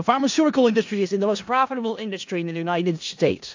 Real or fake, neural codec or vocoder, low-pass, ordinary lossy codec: fake; codec, 16 kHz in and 24 kHz out, 0.4 kbps, LongCat-Audio-Codec, four codebook decoder; 7.2 kHz; AAC, 48 kbps